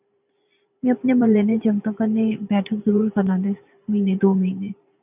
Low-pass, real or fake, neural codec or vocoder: 3.6 kHz; fake; vocoder, 44.1 kHz, 128 mel bands every 512 samples, BigVGAN v2